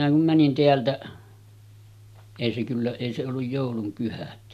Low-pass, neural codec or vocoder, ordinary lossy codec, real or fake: 14.4 kHz; none; none; real